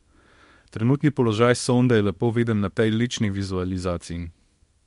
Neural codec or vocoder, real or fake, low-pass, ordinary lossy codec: codec, 24 kHz, 0.9 kbps, WavTokenizer, small release; fake; 10.8 kHz; MP3, 64 kbps